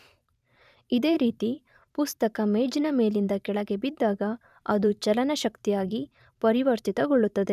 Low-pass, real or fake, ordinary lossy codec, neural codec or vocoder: 14.4 kHz; fake; none; vocoder, 44.1 kHz, 128 mel bands every 512 samples, BigVGAN v2